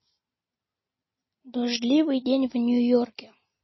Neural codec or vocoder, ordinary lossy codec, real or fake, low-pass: none; MP3, 24 kbps; real; 7.2 kHz